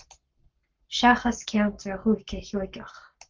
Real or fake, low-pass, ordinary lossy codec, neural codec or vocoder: fake; 7.2 kHz; Opus, 24 kbps; codec, 44.1 kHz, 7.8 kbps, Pupu-Codec